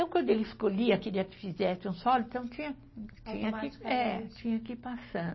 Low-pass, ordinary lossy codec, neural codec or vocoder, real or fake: 7.2 kHz; MP3, 24 kbps; none; real